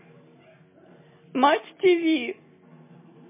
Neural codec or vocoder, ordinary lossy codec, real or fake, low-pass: none; MP3, 16 kbps; real; 3.6 kHz